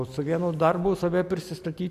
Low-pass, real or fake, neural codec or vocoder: 14.4 kHz; real; none